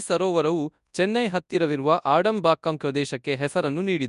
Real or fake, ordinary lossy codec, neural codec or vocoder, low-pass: fake; none; codec, 24 kHz, 0.9 kbps, WavTokenizer, large speech release; 10.8 kHz